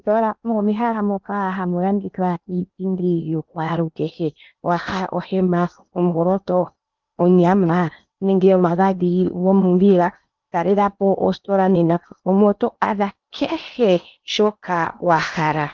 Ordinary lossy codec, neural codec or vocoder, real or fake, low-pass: Opus, 32 kbps; codec, 16 kHz in and 24 kHz out, 0.8 kbps, FocalCodec, streaming, 65536 codes; fake; 7.2 kHz